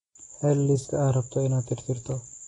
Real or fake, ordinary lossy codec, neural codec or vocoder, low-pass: real; AAC, 32 kbps; none; 10.8 kHz